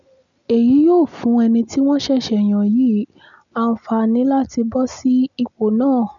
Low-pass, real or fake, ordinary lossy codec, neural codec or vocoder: 7.2 kHz; real; none; none